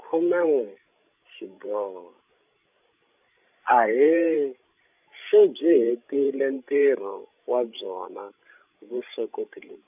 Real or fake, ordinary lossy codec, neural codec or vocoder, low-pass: fake; none; codec, 16 kHz, 16 kbps, FreqCodec, larger model; 3.6 kHz